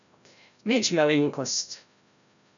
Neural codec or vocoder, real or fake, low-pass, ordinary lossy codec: codec, 16 kHz, 0.5 kbps, FreqCodec, larger model; fake; 7.2 kHz; none